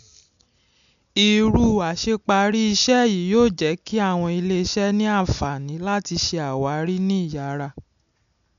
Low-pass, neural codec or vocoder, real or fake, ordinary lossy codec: 7.2 kHz; none; real; none